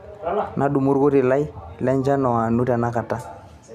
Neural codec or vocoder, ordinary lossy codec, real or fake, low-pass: none; none; real; 14.4 kHz